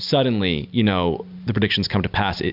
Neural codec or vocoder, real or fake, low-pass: none; real; 5.4 kHz